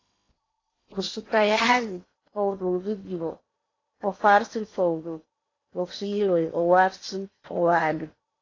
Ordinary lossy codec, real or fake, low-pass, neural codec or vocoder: AAC, 32 kbps; fake; 7.2 kHz; codec, 16 kHz in and 24 kHz out, 0.8 kbps, FocalCodec, streaming, 65536 codes